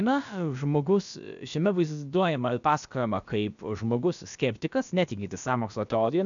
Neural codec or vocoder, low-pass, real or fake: codec, 16 kHz, about 1 kbps, DyCAST, with the encoder's durations; 7.2 kHz; fake